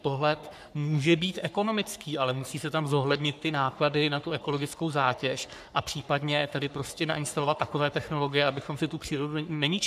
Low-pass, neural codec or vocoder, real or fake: 14.4 kHz; codec, 44.1 kHz, 3.4 kbps, Pupu-Codec; fake